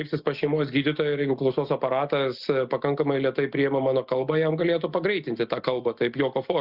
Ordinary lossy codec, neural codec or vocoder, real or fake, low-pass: Opus, 64 kbps; none; real; 5.4 kHz